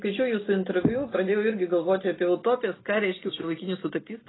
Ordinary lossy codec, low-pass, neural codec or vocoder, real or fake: AAC, 16 kbps; 7.2 kHz; none; real